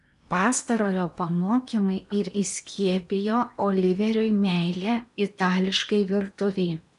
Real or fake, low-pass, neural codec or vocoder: fake; 10.8 kHz; codec, 16 kHz in and 24 kHz out, 0.8 kbps, FocalCodec, streaming, 65536 codes